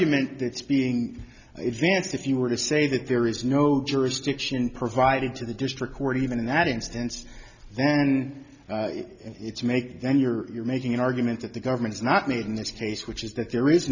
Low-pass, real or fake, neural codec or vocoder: 7.2 kHz; real; none